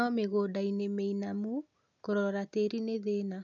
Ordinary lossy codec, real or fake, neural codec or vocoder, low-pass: none; real; none; 7.2 kHz